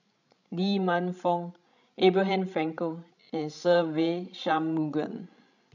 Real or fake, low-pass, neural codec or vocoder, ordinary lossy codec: fake; 7.2 kHz; codec, 16 kHz, 16 kbps, FreqCodec, larger model; none